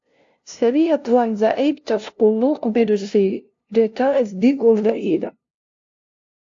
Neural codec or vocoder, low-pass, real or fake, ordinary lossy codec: codec, 16 kHz, 0.5 kbps, FunCodec, trained on LibriTTS, 25 frames a second; 7.2 kHz; fake; AAC, 48 kbps